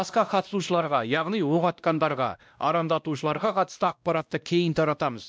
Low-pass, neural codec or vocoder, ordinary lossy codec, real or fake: none; codec, 16 kHz, 1 kbps, X-Codec, WavLM features, trained on Multilingual LibriSpeech; none; fake